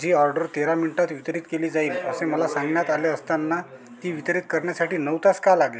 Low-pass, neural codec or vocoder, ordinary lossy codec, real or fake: none; none; none; real